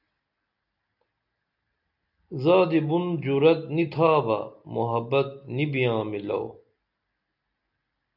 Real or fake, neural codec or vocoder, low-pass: real; none; 5.4 kHz